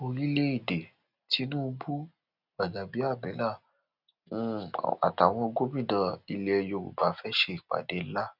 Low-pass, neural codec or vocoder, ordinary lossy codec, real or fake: 5.4 kHz; none; none; real